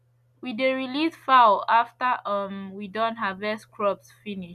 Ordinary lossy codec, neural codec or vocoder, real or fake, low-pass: none; none; real; 14.4 kHz